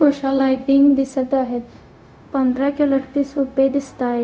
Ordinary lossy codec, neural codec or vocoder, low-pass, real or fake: none; codec, 16 kHz, 0.4 kbps, LongCat-Audio-Codec; none; fake